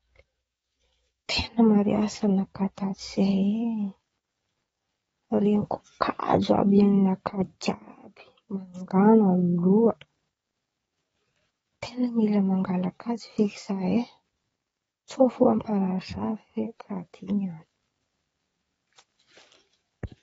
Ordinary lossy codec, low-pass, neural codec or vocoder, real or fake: AAC, 24 kbps; 19.8 kHz; codec, 44.1 kHz, 7.8 kbps, DAC; fake